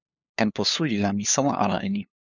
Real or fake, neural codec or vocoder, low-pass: fake; codec, 16 kHz, 2 kbps, FunCodec, trained on LibriTTS, 25 frames a second; 7.2 kHz